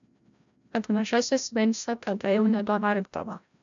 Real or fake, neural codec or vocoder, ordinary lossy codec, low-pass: fake; codec, 16 kHz, 0.5 kbps, FreqCodec, larger model; MP3, 64 kbps; 7.2 kHz